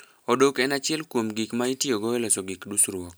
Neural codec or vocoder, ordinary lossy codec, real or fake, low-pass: none; none; real; none